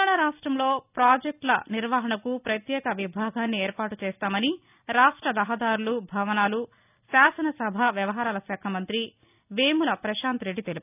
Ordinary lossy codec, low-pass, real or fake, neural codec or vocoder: none; 3.6 kHz; real; none